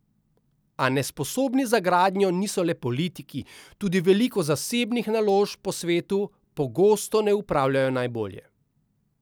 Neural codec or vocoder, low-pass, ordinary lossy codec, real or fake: none; none; none; real